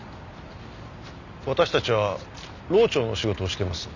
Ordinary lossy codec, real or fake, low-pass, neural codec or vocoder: none; real; 7.2 kHz; none